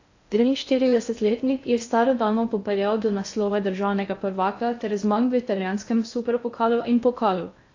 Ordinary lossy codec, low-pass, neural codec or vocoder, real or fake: AAC, 48 kbps; 7.2 kHz; codec, 16 kHz in and 24 kHz out, 0.6 kbps, FocalCodec, streaming, 2048 codes; fake